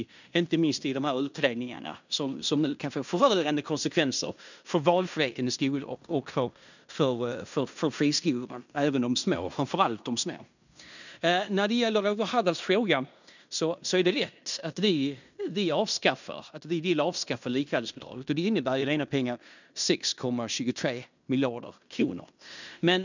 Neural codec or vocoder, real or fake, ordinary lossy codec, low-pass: codec, 16 kHz in and 24 kHz out, 0.9 kbps, LongCat-Audio-Codec, fine tuned four codebook decoder; fake; none; 7.2 kHz